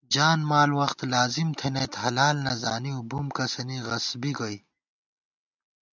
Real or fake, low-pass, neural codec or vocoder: real; 7.2 kHz; none